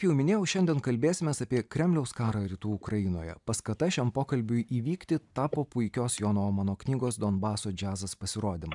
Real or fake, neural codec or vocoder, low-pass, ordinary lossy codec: real; none; 10.8 kHz; MP3, 96 kbps